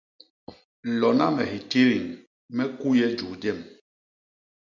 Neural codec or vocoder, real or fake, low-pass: none; real; 7.2 kHz